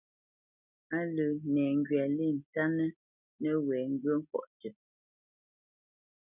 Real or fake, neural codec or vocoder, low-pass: real; none; 3.6 kHz